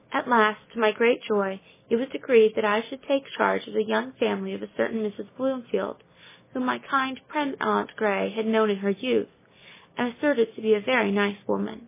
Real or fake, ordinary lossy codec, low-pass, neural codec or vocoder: real; MP3, 16 kbps; 3.6 kHz; none